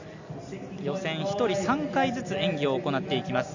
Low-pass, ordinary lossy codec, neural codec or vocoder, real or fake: 7.2 kHz; none; none; real